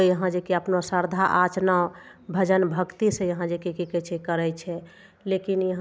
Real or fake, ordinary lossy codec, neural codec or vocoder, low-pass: real; none; none; none